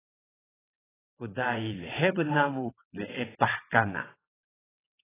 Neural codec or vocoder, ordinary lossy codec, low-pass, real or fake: vocoder, 22.05 kHz, 80 mel bands, WaveNeXt; AAC, 16 kbps; 3.6 kHz; fake